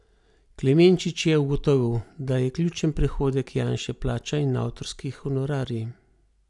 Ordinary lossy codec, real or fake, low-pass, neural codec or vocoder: none; real; 10.8 kHz; none